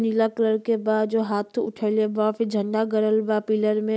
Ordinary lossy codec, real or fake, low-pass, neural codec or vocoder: none; real; none; none